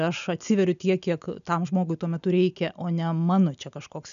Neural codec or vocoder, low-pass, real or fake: none; 7.2 kHz; real